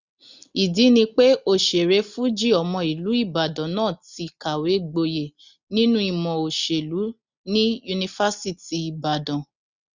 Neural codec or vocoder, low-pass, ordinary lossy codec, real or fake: none; 7.2 kHz; Opus, 64 kbps; real